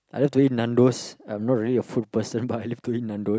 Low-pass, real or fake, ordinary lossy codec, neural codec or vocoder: none; real; none; none